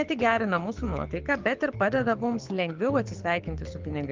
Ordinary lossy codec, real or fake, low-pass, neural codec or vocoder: Opus, 24 kbps; fake; 7.2 kHz; codec, 24 kHz, 6 kbps, HILCodec